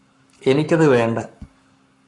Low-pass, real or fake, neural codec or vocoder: 10.8 kHz; fake; codec, 44.1 kHz, 7.8 kbps, Pupu-Codec